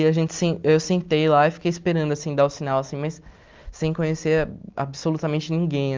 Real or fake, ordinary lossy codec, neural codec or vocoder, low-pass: real; Opus, 32 kbps; none; 7.2 kHz